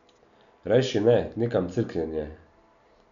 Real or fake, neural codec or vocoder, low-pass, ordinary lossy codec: real; none; 7.2 kHz; none